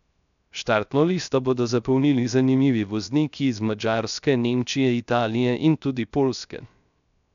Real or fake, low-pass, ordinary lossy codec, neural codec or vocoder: fake; 7.2 kHz; none; codec, 16 kHz, 0.3 kbps, FocalCodec